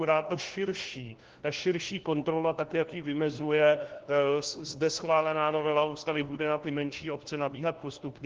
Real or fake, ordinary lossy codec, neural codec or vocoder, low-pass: fake; Opus, 16 kbps; codec, 16 kHz, 1 kbps, FunCodec, trained on LibriTTS, 50 frames a second; 7.2 kHz